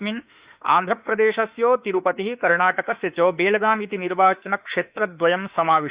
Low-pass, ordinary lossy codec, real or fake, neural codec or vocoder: 3.6 kHz; Opus, 64 kbps; fake; autoencoder, 48 kHz, 32 numbers a frame, DAC-VAE, trained on Japanese speech